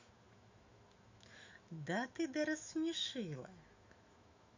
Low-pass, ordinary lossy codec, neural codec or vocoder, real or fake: 7.2 kHz; Opus, 64 kbps; autoencoder, 48 kHz, 128 numbers a frame, DAC-VAE, trained on Japanese speech; fake